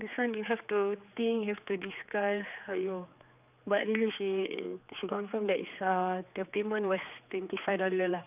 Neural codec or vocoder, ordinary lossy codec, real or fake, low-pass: codec, 16 kHz, 4 kbps, X-Codec, HuBERT features, trained on general audio; none; fake; 3.6 kHz